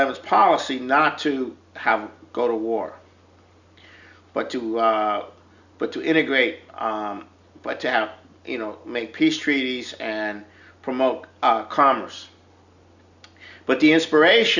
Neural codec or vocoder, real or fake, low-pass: none; real; 7.2 kHz